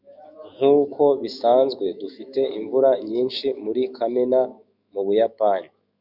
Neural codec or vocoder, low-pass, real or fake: autoencoder, 48 kHz, 128 numbers a frame, DAC-VAE, trained on Japanese speech; 5.4 kHz; fake